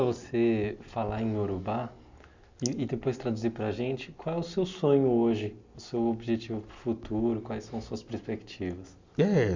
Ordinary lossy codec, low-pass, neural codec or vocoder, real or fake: MP3, 64 kbps; 7.2 kHz; none; real